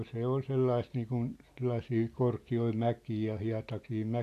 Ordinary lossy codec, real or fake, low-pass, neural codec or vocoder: AAC, 64 kbps; real; 14.4 kHz; none